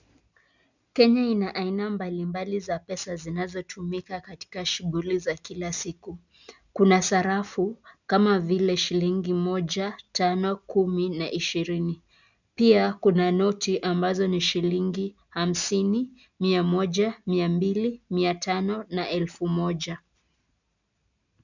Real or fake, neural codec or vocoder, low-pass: real; none; 7.2 kHz